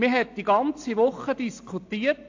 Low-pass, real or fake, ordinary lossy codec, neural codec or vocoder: 7.2 kHz; real; AAC, 48 kbps; none